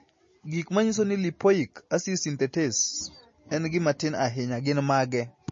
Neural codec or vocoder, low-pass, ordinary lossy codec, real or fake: none; 7.2 kHz; MP3, 32 kbps; real